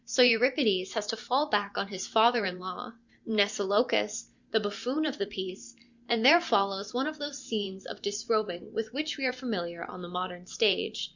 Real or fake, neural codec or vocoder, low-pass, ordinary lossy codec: fake; vocoder, 44.1 kHz, 128 mel bands every 512 samples, BigVGAN v2; 7.2 kHz; Opus, 64 kbps